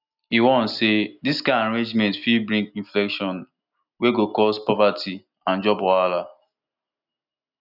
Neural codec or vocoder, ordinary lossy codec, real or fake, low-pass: none; none; real; 5.4 kHz